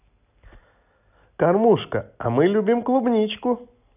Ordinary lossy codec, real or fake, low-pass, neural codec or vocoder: none; real; 3.6 kHz; none